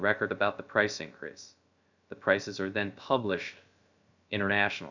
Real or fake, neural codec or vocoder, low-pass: fake; codec, 16 kHz, 0.3 kbps, FocalCodec; 7.2 kHz